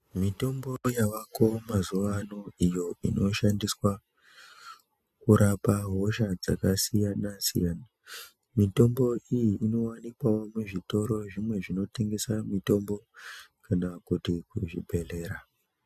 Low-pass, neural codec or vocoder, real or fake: 14.4 kHz; none; real